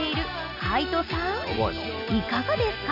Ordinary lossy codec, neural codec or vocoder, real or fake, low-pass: none; none; real; 5.4 kHz